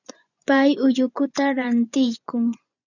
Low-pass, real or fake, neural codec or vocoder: 7.2 kHz; fake; vocoder, 24 kHz, 100 mel bands, Vocos